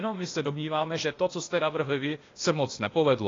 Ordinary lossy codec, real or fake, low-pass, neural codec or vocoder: AAC, 32 kbps; fake; 7.2 kHz; codec, 16 kHz, 0.8 kbps, ZipCodec